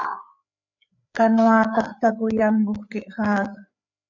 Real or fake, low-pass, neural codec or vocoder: fake; 7.2 kHz; codec, 16 kHz, 4 kbps, FreqCodec, larger model